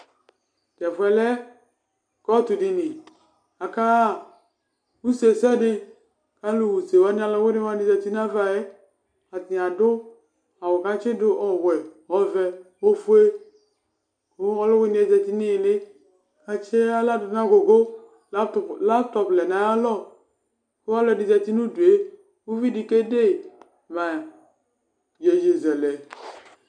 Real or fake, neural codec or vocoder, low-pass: real; none; 9.9 kHz